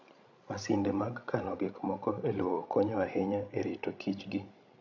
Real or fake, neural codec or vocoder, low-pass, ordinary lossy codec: fake; codec, 16 kHz, 16 kbps, FreqCodec, larger model; 7.2 kHz; none